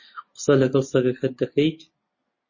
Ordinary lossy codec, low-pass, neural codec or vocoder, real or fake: MP3, 32 kbps; 7.2 kHz; none; real